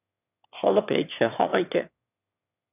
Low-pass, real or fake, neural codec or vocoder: 3.6 kHz; fake; autoencoder, 22.05 kHz, a latent of 192 numbers a frame, VITS, trained on one speaker